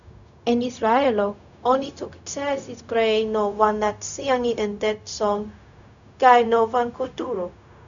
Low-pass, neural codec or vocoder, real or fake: 7.2 kHz; codec, 16 kHz, 0.4 kbps, LongCat-Audio-Codec; fake